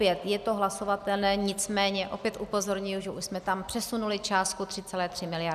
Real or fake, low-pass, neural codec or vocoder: real; 14.4 kHz; none